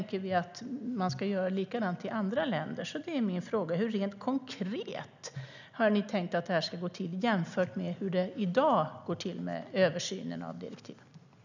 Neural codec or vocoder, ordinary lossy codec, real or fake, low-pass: none; none; real; 7.2 kHz